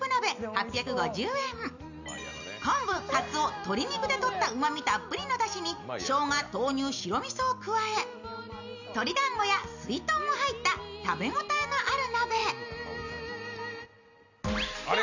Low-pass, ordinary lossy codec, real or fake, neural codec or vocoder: 7.2 kHz; none; real; none